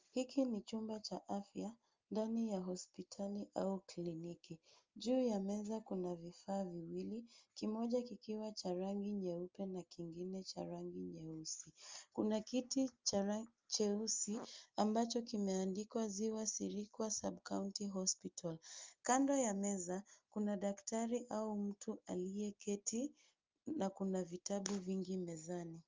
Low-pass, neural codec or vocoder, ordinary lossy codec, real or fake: 7.2 kHz; none; Opus, 32 kbps; real